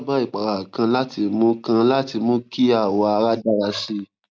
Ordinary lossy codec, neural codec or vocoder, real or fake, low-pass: none; none; real; none